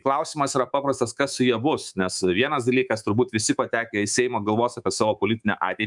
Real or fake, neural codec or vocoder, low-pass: fake; codec, 24 kHz, 3.1 kbps, DualCodec; 10.8 kHz